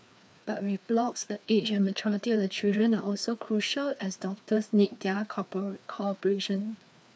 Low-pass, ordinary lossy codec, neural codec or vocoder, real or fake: none; none; codec, 16 kHz, 2 kbps, FreqCodec, larger model; fake